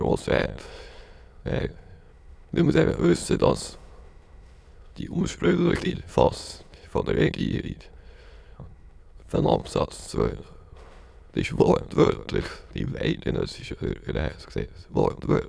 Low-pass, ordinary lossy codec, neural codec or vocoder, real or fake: none; none; autoencoder, 22.05 kHz, a latent of 192 numbers a frame, VITS, trained on many speakers; fake